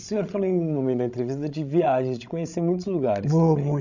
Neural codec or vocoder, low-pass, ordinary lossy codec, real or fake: codec, 16 kHz, 16 kbps, FreqCodec, larger model; 7.2 kHz; none; fake